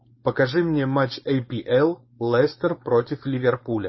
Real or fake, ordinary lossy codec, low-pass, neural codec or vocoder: fake; MP3, 24 kbps; 7.2 kHz; codec, 16 kHz, 4.8 kbps, FACodec